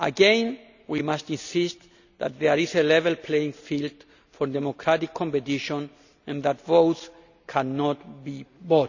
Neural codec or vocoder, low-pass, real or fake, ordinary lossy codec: none; 7.2 kHz; real; none